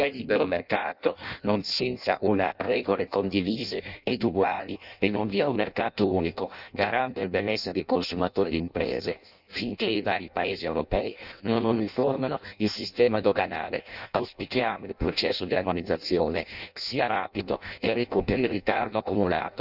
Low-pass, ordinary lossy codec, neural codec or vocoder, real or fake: 5.4 kHz; none; codec, 16 kHz in and 24 kHz out, 0.6 kbps, FireRedTTS-2 codec; fake